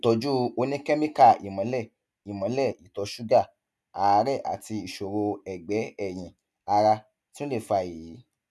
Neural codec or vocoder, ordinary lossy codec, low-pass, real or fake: none; none; none; real